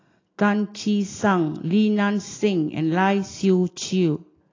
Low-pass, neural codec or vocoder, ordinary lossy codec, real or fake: 7.2 kHz; none; AAC, 32 kbps; real